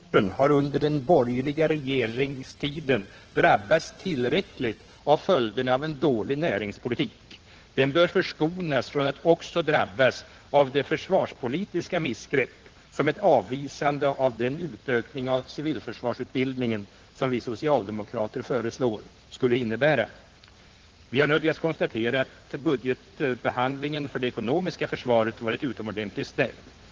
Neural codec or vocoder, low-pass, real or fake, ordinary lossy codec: codec, 16 kHz in and 24 kHz out, 2.2 kbps, FireRedTTS-2 codec; 7.2 kHz; fake; Opus, 16 kbps